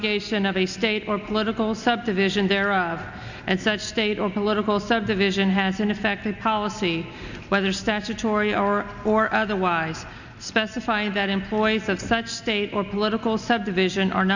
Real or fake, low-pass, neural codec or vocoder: real; 7.2 kHz; none